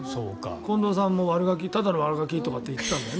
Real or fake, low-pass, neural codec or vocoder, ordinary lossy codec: real; none; none; none